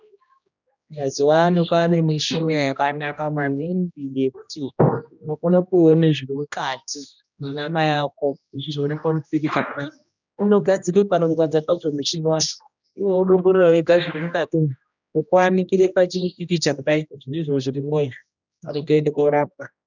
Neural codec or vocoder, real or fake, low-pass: codec, 16 kHz, 1 kbps, X-Codec, HuBERT features, trained on general audio; fake; 7.2 kHz